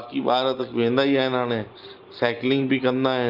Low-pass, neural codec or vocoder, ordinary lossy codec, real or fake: 5.4 kHz; none; Opus, 24 kbps; real